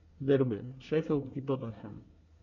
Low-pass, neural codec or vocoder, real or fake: 7.2 kHz; codec, 24 kHz, 1 kbps, SNAC; fake